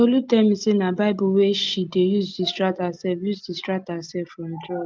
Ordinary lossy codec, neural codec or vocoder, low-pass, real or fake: Opus, 24 kbps; none; 7.2 kHz; real